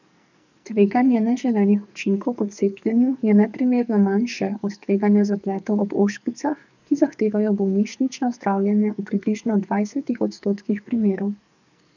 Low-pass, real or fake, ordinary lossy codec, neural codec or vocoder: 7.2 kHz; fake; none; codec, 44.1 kHz, 2.6 kbps, SNAC